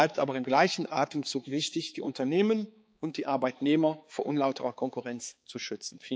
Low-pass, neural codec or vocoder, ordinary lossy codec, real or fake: none; codec, 16 kHz, 4 kbps, X-Codec, HuBERT features, trained on balanced general audio; none; fake